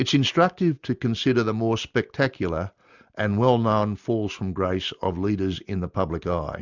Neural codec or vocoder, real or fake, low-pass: none; real; 7.2 kHz